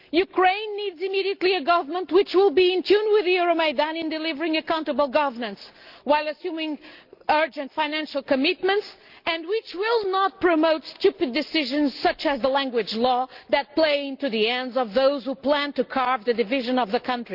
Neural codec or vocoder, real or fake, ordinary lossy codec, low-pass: none; real; Opus, 32 kbps; 5.4 kHz